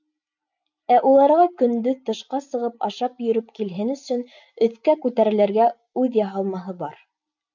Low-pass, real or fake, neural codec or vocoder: 7.2 kHz; real; none